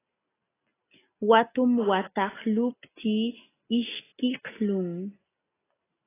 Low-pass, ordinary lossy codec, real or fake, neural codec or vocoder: 3.6 kHz; AAC, 16 kbps; real; none